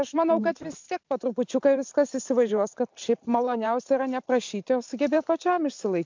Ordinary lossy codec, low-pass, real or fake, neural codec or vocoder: MP3, 64 kbps; 7.2 kHz; fake; vocoder, 44.1 kHz, 128 mel bands every 512 samples, BigVGAN v2